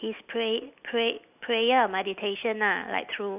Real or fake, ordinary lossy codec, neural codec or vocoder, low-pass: real; none; none; 3.6 kHz